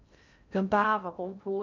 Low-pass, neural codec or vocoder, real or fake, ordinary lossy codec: 7.2 kHz; codec, 16 kHz in and 24 kHz out, 0.6 kbps, FocalCodec, streaming, 2048 codes; fake; none